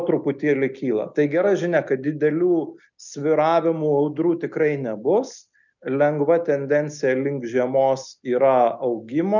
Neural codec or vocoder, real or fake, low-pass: none; real; 7.2 kHz